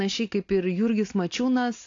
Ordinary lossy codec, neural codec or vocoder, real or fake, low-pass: AAC, 48 kbps; none; real; 7.2 kHz